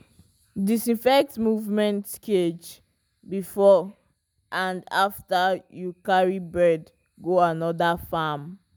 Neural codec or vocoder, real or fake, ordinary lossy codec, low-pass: none; real; none; none